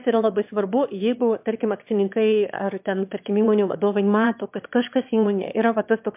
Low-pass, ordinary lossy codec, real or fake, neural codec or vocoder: 3.6 kHz; MP3, 32 kbps; fake; autoencoder, 22.05 kHz, a latent of 192 numbers a frame, VITS, trained on one speaker